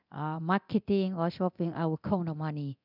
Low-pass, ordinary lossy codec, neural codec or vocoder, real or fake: 5.4 kHz; none; codec, 24 kHz, 0.9 kbps, DualCodec; fake